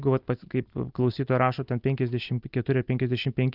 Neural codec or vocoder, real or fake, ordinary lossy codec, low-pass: none; real; Opus, 24 kbps; 5.4 kHz